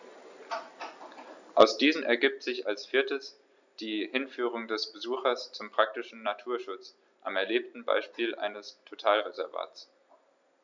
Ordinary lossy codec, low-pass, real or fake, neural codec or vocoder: none; 7.2 kHz; real; none